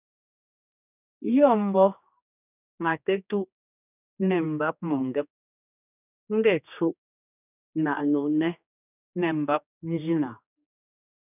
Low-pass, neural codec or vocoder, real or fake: 3.6 kHz; codec, 16 kHz, 2 kbps, X-Codec, HuBERT features, trained on general audio; fake